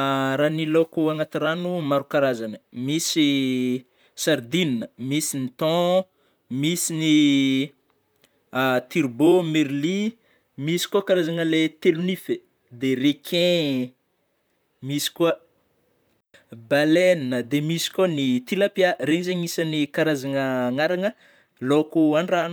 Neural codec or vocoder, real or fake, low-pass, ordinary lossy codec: vocoder, 44.1 kHz, 128 mel bands, Pupu-Vocoder; fake; none; none